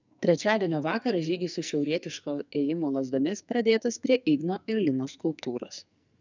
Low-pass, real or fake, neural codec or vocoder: 7.2 kHz; fake; codec, 44.1 kHz, 2.6 kbps, SNAC